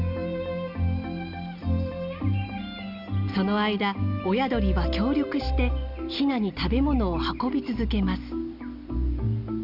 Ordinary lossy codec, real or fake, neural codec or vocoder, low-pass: none; real; none; 5.4 kHz